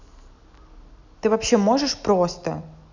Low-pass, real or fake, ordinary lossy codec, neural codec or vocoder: 7.2 kHz; real; none; none